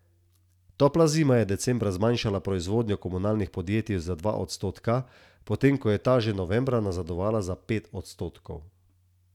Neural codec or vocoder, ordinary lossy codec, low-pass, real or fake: none; none; 19.8 kHz; real